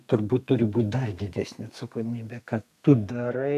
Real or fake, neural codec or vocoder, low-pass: fake; codec, 32 kHz, 1.9 kbps, SNAC; 14.4 kHz